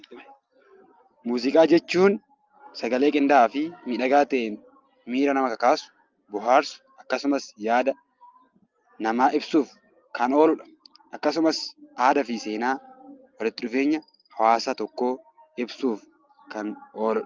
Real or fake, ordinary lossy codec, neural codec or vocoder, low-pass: real; Opus, 24 kbps; none; 7.2 kHz